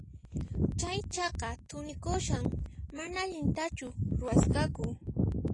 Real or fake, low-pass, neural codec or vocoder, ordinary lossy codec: real; 10.8 kHz; none; AAC, 32 kbps